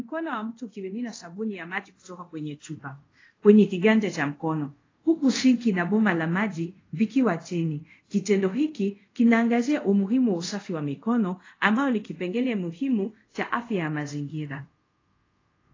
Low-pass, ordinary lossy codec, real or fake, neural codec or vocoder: 7.2 kHz; AAC, 32 kbps; fake; codec, 24 kHz, 0.5 kbps, DualCodec